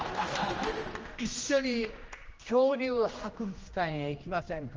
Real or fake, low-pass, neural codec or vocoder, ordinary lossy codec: fake; 7.2 kHz; codec, 16 kHz, 1 kbps, X-Codec, HuBERT features, trained on general audio; Opus, 24 kbps